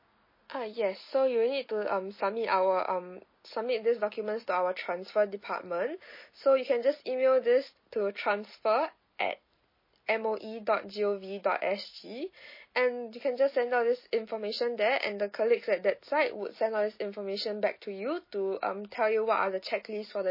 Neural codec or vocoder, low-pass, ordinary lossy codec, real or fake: none; 5.4 kHz; MP3, 24 kbps; real